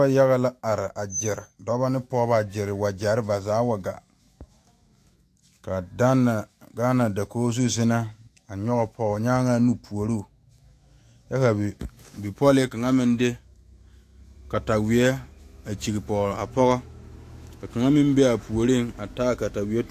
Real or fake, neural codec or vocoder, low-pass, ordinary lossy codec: fake; autoencoder, 48 kHz, 128 numbers a frame, DAC-VAE, trained on Japanese speech; 14.4 kHz; AAC, 64 kbps